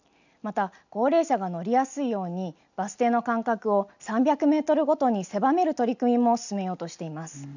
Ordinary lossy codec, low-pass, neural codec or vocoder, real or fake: none; 7.2 kHz; none; real